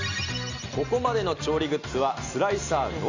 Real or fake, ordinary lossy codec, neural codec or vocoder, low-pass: real; Opus, 64 kbps; none; 7.2 kHz